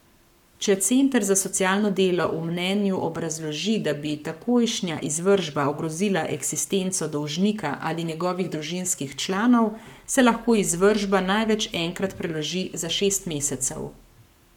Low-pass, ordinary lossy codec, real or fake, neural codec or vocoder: 19.8 kHz; none; fake; codec, 44.1 kHz, 7.8 kbps, Pupu-Codec